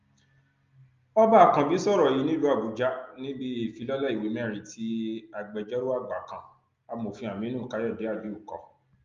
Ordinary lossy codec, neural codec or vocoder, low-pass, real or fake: Opus, 32 kbps; none; 7.2 kHz; real